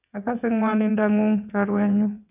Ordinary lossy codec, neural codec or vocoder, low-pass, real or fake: none; vocoder, 22.05 kHz, 80 mel bands, WaveNeXt; 3.6 kHz; fake